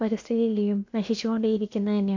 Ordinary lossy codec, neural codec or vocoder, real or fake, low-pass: AAC, 48 kbps; codec, 16 kHz, about 1 kbps, DyCAST, with the encoder's durations; fake; 7.2 kHz